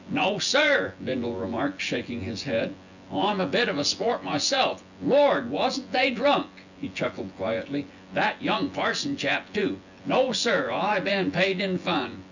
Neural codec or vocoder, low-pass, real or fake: vocoder, 24 kHz, 100 mel bands, Vocos; 7.2 kHz; fake